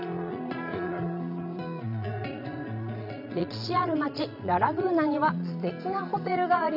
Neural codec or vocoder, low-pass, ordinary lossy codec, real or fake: vocoder, 22.05 kHz, 80 mel bands, Vocos; 5.4 kHz; none; fake